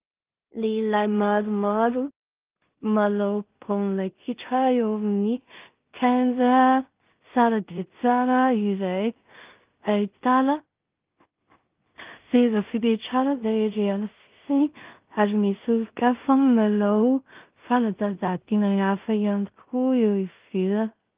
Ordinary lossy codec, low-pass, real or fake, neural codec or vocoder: Opus, 32 kbps; 3.6 kHz; fake; codec, 16 kHz in and 24 kHz out, 0.4 kbps, LongCat-Audio-Codec, two codebook decoder